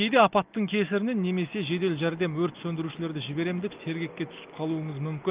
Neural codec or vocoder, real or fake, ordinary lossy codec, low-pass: none; real; Opus, 32 kbps; 3.6 kHz